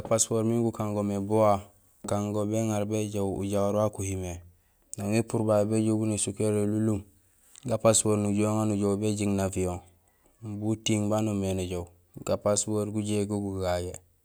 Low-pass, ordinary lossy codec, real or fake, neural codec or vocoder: none; none; real; none